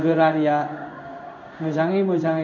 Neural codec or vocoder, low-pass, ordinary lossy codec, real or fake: codec, 16 kHz in and 24 kHz out, 1 kbps, XY-Tokenizer; 7.2 kHz; none; fake